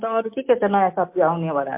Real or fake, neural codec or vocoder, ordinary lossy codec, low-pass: real; none; MP3, 32 kbps; 3.6 kHz